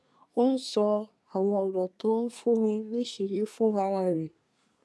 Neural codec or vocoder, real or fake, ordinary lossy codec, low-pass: codec, 24 kHz, 1 kbps, SNAC; fake; none; none